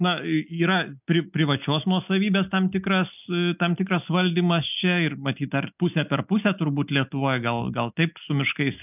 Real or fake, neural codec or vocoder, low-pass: real; none; 3.6 kHz